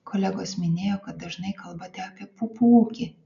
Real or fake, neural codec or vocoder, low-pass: real; none; 7.2 kHz